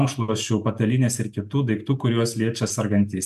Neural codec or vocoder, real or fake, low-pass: none; real; 14.4 kHz